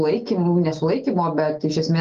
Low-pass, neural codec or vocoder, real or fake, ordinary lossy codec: 7.2 kHz; none; real; Opus, 24 kbps